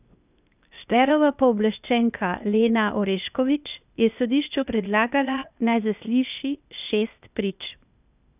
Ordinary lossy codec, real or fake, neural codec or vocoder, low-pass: none; fake; codec, 16 kHz, 0.8 kbps, ZipCodec; 3.6 kHz